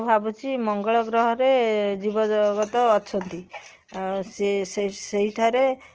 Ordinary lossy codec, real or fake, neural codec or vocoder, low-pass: Opus, 16 kbps; real; none; 7.2 kHz